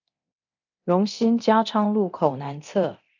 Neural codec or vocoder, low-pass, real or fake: codec, 24 kHz, 0.9 kbps, DualCodec; 7.2 kHz; fake